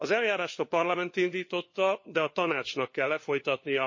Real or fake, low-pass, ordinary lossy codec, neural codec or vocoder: fake; 7.2 kHz; MP3, 32 kbps; codec, 16 kHz, 4 kbps, FunCodec, trained on LibriTTS, 50 frames a second